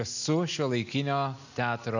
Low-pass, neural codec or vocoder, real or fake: 7.2 kHz; none; real